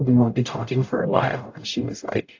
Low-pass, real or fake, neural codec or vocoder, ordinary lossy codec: 7.2 kHz; fake; codec, 44.1 kHz, 0.9 kbps, DAC; AAC, 48 kbps